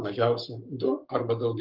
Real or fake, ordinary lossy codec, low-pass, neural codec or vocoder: fake; Opus, 32 kbps; 5.4 kHz; codec, 44.1 kHz, 7.8 kbps, Pupu-Codec